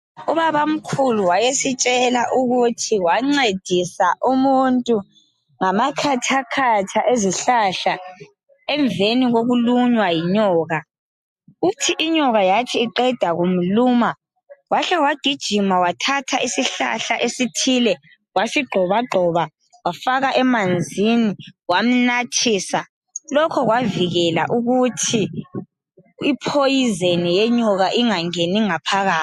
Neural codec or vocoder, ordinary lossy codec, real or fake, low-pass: none; MP3, 64 kbps; real; 14.4 kHz